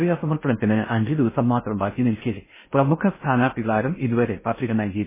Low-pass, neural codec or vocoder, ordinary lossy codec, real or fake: 3.6 kHz; codec, 16 kHz in and 24 kHz out, 0.6 kbps, FocalCodec, streaming, 4096 codes; MP3, 16 kbps; fake